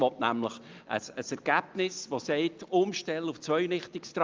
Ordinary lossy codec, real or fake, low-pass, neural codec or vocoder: Opus, 24 kbps; real; 7.2 kHz; none